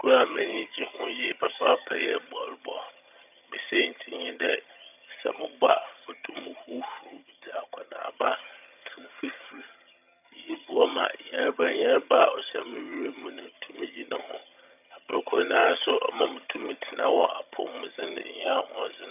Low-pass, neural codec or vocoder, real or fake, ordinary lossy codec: 3.6 kHz; vocoder, 22.05 kHz, 80 mel bands, HiFi-GAN; fake; none